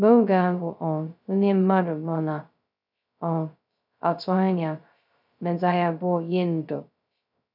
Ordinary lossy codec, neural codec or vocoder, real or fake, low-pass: none; codec, 16 kHz, 0.2 kbps, FocalCodec; fake; 5.4 kHz